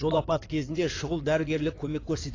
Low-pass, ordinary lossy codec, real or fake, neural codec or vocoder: 7.2 kHz; AAC, 32 kbps; fake; codec, 16 kHz in and 24 kHz out, 2.2 kbps, FireRedTTS-2 codec